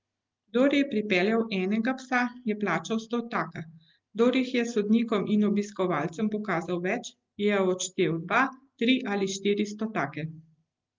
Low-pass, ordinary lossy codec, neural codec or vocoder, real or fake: 7.2 kHz; Opus, 32 kbps; none; real